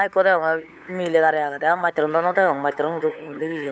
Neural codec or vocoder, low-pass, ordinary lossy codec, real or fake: codec, 16 kHz, 8 kbps, FunCodec, trained on LibriTTS, 25 frames a second; none; none; fake